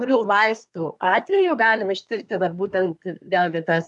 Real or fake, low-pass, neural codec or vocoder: fake; 10.8 kHz; codec, 24 kHz, 1 kbps, SNAC